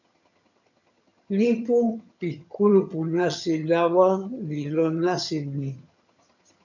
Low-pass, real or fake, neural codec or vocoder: 7.2 kHz; fake; vocoder, 22.05 kHz, 80 mel bands, HiFi-GAN